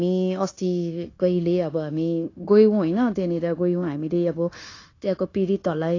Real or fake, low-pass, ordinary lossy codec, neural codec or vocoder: fake; 7.2 kHz; AAC, 32 kbps; codec, 16 kHz, 0.9 kbps, LongCat-Audio-Codec